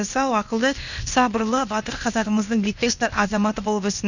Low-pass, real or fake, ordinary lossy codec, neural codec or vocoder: 7.2 kHz; fake; none; codec, 16 kHz, 0.8 kbps, ZipCodec